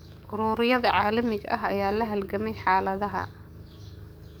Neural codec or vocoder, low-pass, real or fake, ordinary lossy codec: codec, 44.1 kHz, 7.8 kbps, DAC; none; fake; none